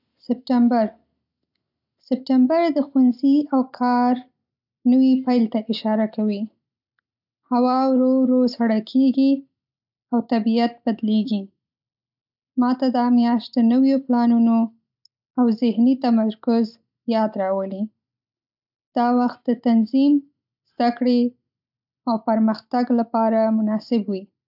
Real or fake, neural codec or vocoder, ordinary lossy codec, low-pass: real; none; none; 5.4 kHz